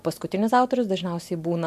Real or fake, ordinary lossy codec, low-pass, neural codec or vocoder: real; MP3, 64 kbps; 14.4 kHz; none